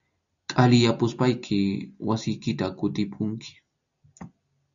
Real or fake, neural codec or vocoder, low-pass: real; none; 7.2 kHz